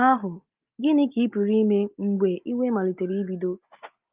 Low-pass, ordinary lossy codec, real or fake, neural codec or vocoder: 3.6 kHz; Opus, 32 kbps; real; none